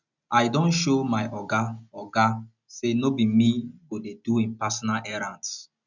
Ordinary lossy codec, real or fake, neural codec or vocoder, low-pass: none; real; none; 7.2 kHz